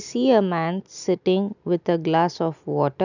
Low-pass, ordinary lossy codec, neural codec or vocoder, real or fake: 7.2 kHz; none; none; real